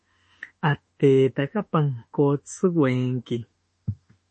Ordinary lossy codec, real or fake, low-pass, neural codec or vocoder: MP3, 32 kbps; fake; 10.8 kHz; autoencoder, 48 kHz, 32 numbers a frame, DAC-VAE, trained on Japanese speech